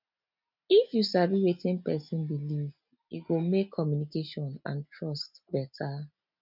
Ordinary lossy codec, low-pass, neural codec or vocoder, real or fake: none; 5.4 kHz; none; real